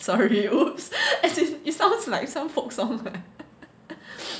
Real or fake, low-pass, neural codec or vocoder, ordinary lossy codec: real; none; none; none